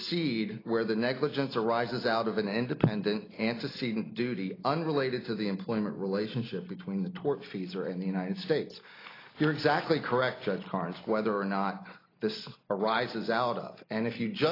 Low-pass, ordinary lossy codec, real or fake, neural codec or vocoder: 5.4 kHz; AAC, 24 kbps; real; none